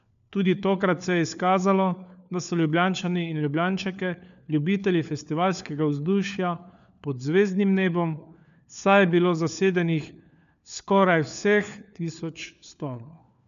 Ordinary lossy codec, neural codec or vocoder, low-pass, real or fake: none; codec, 16 kHz, 4 kbps, FunCodec, trained on LibriTTS, 50 frames a second; 7.2 kHz; fake